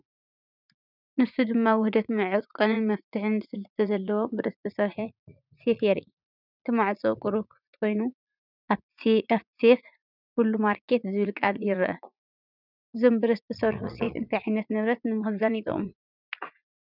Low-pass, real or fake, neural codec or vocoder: 5.4 kHz; fake; vocoder, 44.1 kHz, 80 mel bands, Vocos